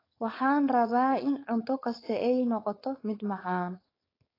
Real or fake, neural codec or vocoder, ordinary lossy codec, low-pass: fake; codec, 16 kHz, 4.8 kbps, FACodec; AAC, 24 kbps; 5.4 kHz